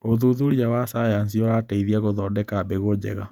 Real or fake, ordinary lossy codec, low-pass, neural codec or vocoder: fake; none; 19.8 kHz; vocoder, 48 kHz, 128 mel bands, Vocos